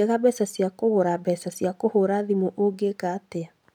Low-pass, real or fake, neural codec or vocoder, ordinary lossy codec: 19.8 kHz; real; none; none